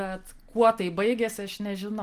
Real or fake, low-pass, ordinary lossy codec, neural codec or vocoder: real; 14.4 kHz; Opus, 24 kbps; none